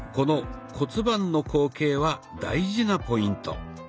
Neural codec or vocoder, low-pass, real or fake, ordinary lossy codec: none; none; real; none